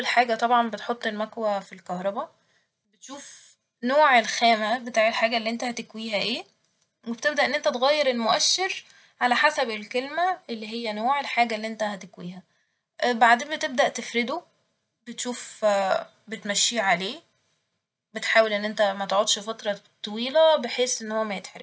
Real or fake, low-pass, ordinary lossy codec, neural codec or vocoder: real; none; none; none